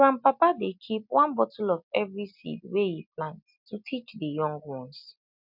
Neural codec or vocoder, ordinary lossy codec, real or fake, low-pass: none; MP3, 48 kbps; real; 5.4 kHz